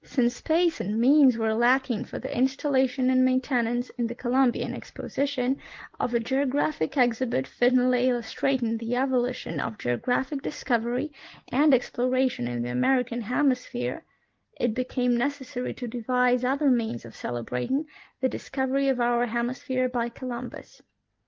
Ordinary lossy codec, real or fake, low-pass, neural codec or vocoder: Opus, 16 kbps; real; 7.2 kHz; none